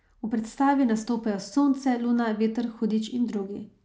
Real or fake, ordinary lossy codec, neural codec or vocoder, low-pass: real; none; none; none